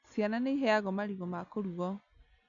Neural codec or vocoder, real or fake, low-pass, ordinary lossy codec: none; real; 7.2 kHz; MP3, 96 kbps